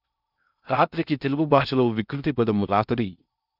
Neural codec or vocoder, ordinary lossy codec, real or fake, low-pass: codec, 16 kHz in and 24 kHz out, 0.6 kbps, FocalCodec, streaming, 2048 codes; none; fake; 5.4 kHz